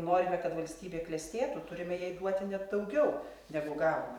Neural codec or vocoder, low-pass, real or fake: vocoder, 44.1 kHz, 128 mel bands every 512 samples, BigVGAN v2; 19.8 kHz; fake